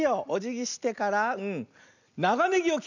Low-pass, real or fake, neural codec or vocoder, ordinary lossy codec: 7.2 kHz; real; none; none